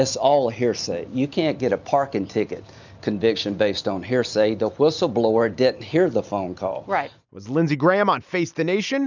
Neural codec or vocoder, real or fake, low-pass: none; real; 7.2 kHz